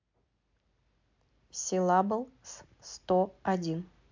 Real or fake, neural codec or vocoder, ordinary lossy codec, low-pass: real; none; MP3, 48 kbps; 7.2 kHz